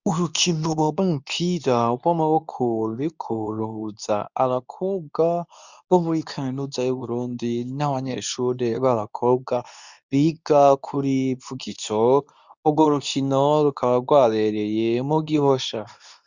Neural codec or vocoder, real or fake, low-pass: codec, 24 kHz, 0.9 kbps, WavTokenizer, medium speech release version 2; fake; 7.2 kHz